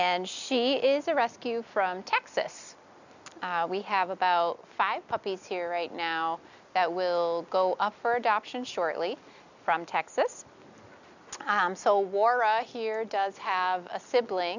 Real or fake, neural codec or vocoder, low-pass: real; none; 7.2 kHz